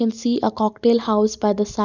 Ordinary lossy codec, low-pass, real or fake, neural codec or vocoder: none; 7.2 kHz; real; none